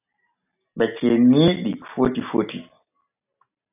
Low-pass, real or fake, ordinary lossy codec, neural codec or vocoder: 3.6 kHz; real; AAC, 32 kbps; none